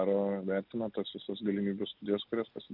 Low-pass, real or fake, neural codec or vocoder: 5.4 kHz; real; none